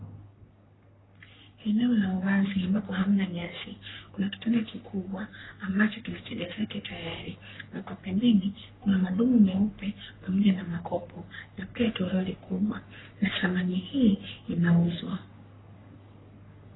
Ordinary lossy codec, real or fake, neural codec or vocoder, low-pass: AAC, 16 kbps; fake; codec, 44.1 kHz, 3.4 kbps, Pupu-Codec; 7.2 kHz